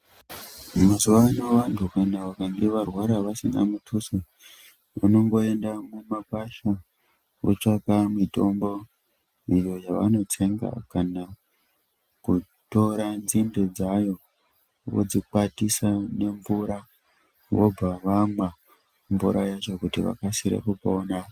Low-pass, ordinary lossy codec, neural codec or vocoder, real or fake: 14.4 kHz; Opus, 32 kbps; none; real